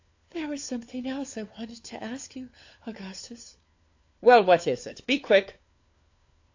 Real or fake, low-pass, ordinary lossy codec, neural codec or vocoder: fake; 7.2 kHz; AAC, 48 kbps; codec, 16 kHz, 4 kbps, FunCodec, trained on LibriTTS, 50 frames a second